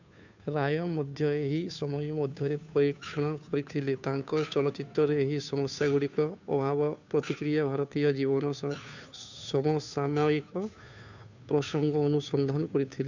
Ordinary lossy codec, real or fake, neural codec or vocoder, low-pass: none; fake; codec, 16 kHz, 2 kbps, FunCodec, trained on Chinese and English, 25 frames a second; 7.2 kHz